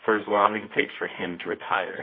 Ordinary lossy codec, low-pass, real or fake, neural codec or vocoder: MP3, 24 kbps; 5.4 kHz; fake; codec, 24 kHz, 0.9 kbps, WavTokenizer, medium music audio release